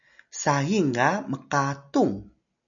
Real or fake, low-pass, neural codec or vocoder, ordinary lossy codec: real; 7.2 kHz; none; MP3, 96 kbps